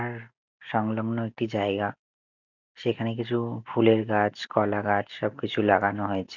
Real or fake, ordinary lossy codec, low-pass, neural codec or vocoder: real; none; 7.2 kHz; none